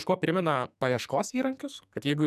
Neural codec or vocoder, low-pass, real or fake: codec, 44.1 kHz, 2.6 kbps, SNAC; 14.4 kHz; fake